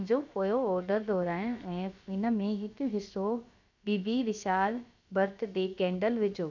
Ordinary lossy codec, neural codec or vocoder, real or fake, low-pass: none; codec, 16 kHz, about 1 kbps, DyCAST, with the encoder's durations; fake; 7.2 kHz